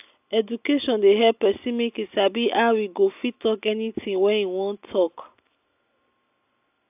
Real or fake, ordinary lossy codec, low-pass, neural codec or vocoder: real; AAC, 32 kbps; 3.6 kHz; none